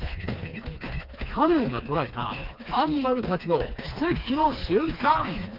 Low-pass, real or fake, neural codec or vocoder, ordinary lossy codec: 5.4 kHz; fake; codec, 16 kHz, 2 kbps, FreqCodec, smaller model; Opus, 24 kbps